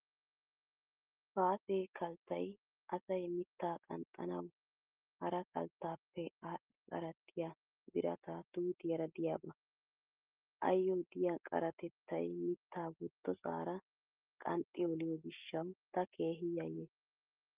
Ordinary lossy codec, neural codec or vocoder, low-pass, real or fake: Opus, 24 kbps; none; 3.6 kHz; real